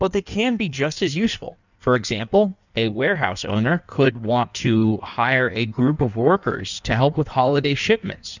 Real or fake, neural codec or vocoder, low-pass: fake; codec, 16 kHz in and 24 kHz out, 1.1 kbps, FireRedTTS-2 codec; 7.2 kHz